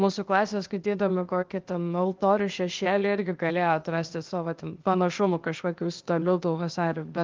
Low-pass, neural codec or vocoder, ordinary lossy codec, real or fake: 7.2 kHz; codec, 16 kHz, 0.8 kbps, ZipCodec; Opus, 32 kbps; fake